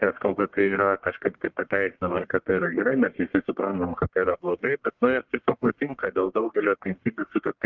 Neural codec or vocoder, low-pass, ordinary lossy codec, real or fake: codec, 44.1 kHz, 1.7 kbps, Pupu-Codec; 7.2 kHz; Opus, 24 kbps; fake